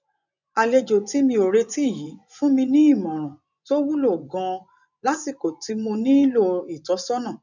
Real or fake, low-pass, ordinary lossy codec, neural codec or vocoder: fake; 7.2 kHz; none; vocoder, 24 kHz, 100 mel bands, Vocos